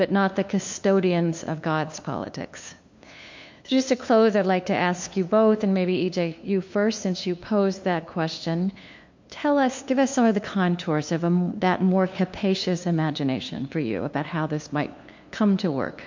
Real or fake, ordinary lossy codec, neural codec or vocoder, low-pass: fake; MP3, 48 kbps; codec, 16 kHz, 2 kbps, FunCodec, trained on LibriTTS, 25 frames a second; 7.2 kHz